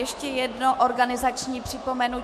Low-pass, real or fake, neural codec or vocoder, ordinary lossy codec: 14.4 kHz; fake; autoencoder, 48 kHz, 128 numbers a frame, DAC-VAE, trained on Japanese speech; MP3, 64 kbps